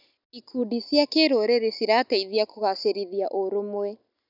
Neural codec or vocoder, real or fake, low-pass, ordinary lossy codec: none; real; 5.4 kHz; none